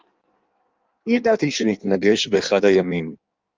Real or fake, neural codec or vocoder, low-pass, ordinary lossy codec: fake; codec, 16 kHz in and 24 kHz out, 1.1 kbps, FireRedTTS-2 codec; 7.2 kHz; Opus, 32 kbps